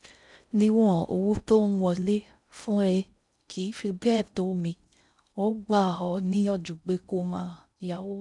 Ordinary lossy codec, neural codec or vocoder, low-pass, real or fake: none; codec, 16 kHz in and 24 kHz out, 0.6 kbps, FocalCodec, streaming, 4096 codes; 10.8 kHz; fake